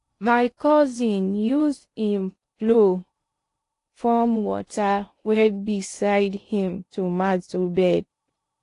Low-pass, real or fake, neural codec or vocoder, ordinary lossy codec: 10.8 kHz; fake; codec, 16 kHz in and 24 kHz out, 0.6 kbps, FocalCodec, streaming, 2048 codes; AAC, 48 kbps